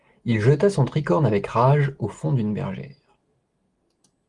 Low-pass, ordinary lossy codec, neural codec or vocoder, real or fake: 10.8 kHz; Opus, 24 kbps; none; real